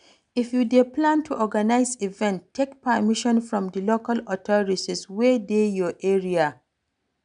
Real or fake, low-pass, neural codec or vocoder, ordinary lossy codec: real; 9.9 kHz; none; none